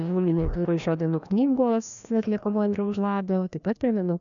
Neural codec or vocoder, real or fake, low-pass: codec, 16 kHz, 1 kbps, FreqCodec, larger model; fake; 7.2 kHz